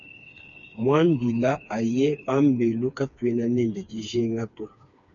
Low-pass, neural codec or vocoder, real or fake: 7.2 kHz; codec, 16 kHz, 4 kbps, FreqCodec, smaller model; fake